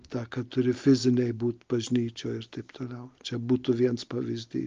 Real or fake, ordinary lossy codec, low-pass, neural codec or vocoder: real; Opus, 32 kbps; 7.2 kHz; none